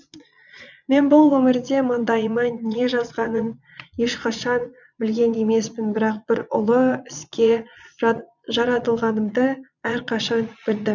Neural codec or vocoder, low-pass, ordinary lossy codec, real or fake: vocoder, 22.05 kHz, 80 mel bands, Vocos; 7.2 kHz; none; fake